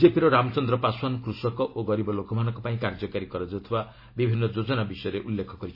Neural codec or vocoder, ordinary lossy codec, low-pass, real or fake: none; MP3, 24 kbps; 5.4 kHz; real